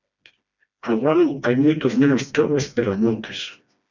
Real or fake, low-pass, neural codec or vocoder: fake; 7.2 kHz; codec, 16 kHz, 1 kbps, FreqCodec, smaller model